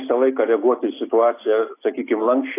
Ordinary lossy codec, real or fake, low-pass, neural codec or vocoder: AAC, 24 kbps; real; 3.6 kHz; none